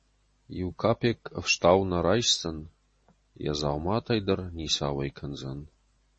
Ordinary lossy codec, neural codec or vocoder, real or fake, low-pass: MP3, 32 kbps; none; real; 10.8 kHz